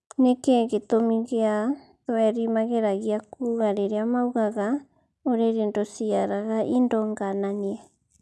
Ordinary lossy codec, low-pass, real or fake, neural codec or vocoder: none; none; real; none